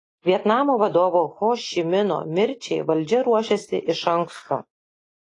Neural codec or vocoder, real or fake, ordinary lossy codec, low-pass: none; real; AAC, 32 kbps; 10.8 kHz